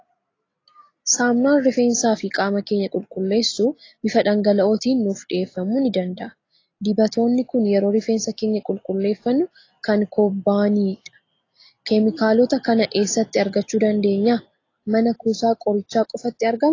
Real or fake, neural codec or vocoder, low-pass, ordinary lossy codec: real; none; 7.2 kHz; AAC, 32 kbps